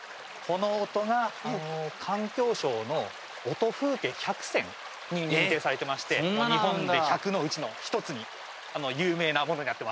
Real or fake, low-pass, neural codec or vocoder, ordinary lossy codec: real; none; none; none